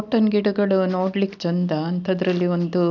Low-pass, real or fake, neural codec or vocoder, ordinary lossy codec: 7.2 kHz; real; none; none